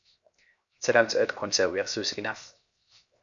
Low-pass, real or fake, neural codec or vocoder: 7.2 kHz; fake; codec, 16 kHz, 0.7 kbps, FocalCodec